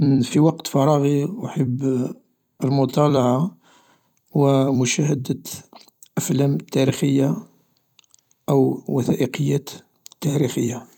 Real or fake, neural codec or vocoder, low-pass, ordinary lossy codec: fake; vocoder, 44.1 kHz, 128 mel bands every 256 samples, BigVGAN v2; 19.8 kHz; none